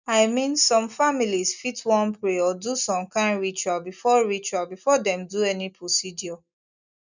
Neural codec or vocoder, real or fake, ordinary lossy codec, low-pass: none; real; none; 7.2 kHz